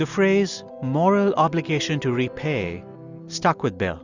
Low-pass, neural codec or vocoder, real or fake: 7.2 kHz; none; real